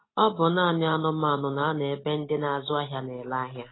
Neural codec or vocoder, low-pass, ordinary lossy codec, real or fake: none; 7.2 kHz; AAC, 16 kbps; real